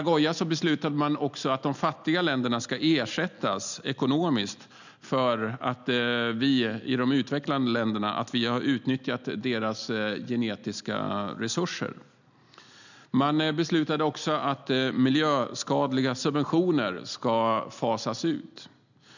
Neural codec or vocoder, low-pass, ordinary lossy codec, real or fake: none; 7.2 kHz; none; real